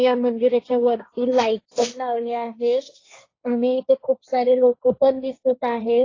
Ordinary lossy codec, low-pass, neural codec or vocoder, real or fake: AAC, 32 kbps; 7.2 kHz; codec, 16 kHz, 1.1 kbps, Voila-Tokenizer; fake